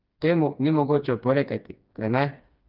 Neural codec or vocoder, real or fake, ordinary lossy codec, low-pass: codec, 16 kHz, 2 kbps, FreqCodec, smaller model; fake; Opus, 24 kbps; 5.4 kHz